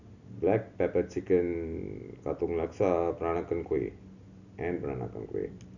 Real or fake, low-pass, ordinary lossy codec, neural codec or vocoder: real; 7.2 kHz; none; none